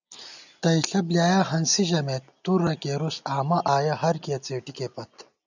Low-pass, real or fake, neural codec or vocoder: 7.2 kHz; real; none